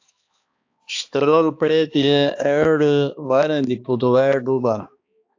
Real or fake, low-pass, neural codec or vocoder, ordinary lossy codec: fake; 7.2 kHz; codec, 16 kHz, 2 kbps, X-Codec, HuBERT features, trained on balanced general audio; AAC, 48 kbps